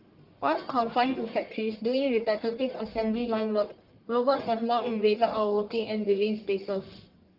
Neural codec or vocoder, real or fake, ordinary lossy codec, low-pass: codec, 44.1 kHz, 1.7 kbps, Pupu-Codec; fake; Opus, 32 kbps; 5.4 kHz